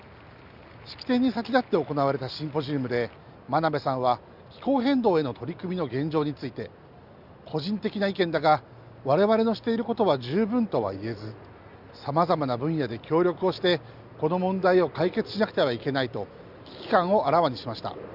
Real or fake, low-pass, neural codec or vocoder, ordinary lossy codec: real; 5.4 kHz; none; Opus, 64 kbps